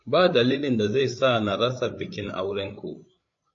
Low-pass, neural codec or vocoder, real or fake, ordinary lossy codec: 7.2 kHz; codec, 16 kHz, 8 kbps, FreqCodec, larger model; fake; AAC, 48 kbps